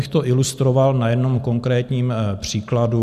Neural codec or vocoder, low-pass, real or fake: none; 14.4 kHz; real